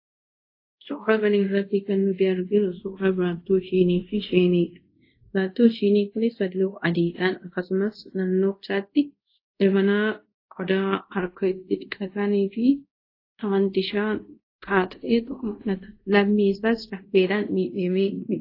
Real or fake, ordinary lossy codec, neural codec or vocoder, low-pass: fake; AAC, 32 kbps; codec, 24 kHz, 0.5 kbps, DualCodec; 5.4 kHz